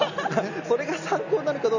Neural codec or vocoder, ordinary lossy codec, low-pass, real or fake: none; none; 7.2 kHz; real